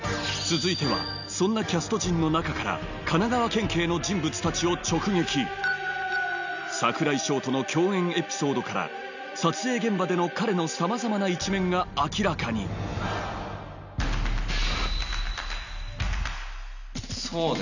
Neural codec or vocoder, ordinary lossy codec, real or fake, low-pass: none; none; real; 7.2 kHz